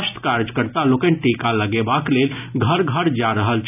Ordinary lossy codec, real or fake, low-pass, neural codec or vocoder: none; real; 3.6 kHz; none